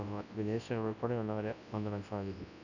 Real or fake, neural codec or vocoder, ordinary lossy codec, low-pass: fake; codec, 24 kHz, 0.9 kbps, WavTokenizer, large speech release; none; 7.2 kHz